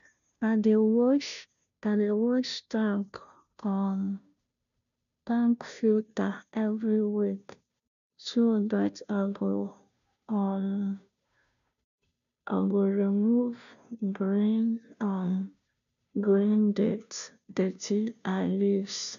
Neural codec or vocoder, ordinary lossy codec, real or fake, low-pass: codec, 16 kHz, 0.5 kbps, FunCodec, trained on Chinese and English, 25 frames a second; none; fake; 7.2 kHz